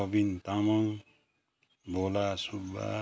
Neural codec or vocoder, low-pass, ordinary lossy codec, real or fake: none; none; none; real